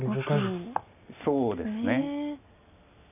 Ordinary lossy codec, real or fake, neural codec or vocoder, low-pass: none; real; none; 3.6 kHz